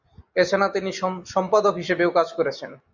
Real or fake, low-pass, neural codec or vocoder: real; 7.2 kHz; none